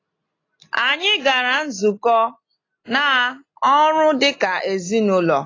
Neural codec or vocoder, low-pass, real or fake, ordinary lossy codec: none; 7.2 kHz; real; AAC, 32 kbps